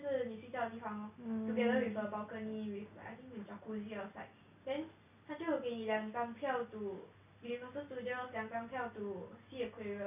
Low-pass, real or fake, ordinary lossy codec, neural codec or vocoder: 3.6 kHz; real; none; none